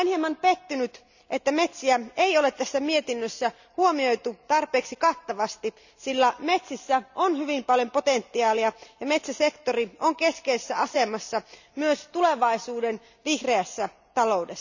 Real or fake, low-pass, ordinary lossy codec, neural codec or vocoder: real; 7.2 kHz; none; none